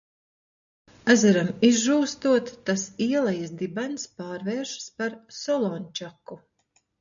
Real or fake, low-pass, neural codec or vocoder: real; 7.2 kHz; none